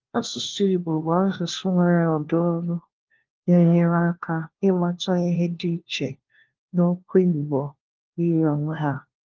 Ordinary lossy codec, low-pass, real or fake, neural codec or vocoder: Opus, 16 kbps; 7.2 kHz; fake; codec, 16 kHz, 1 kbps, FunCodec, trained on LibriTTS, 50 frames a second